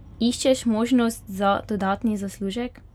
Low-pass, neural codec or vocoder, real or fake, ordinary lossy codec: 19.8 kHz; none; real; none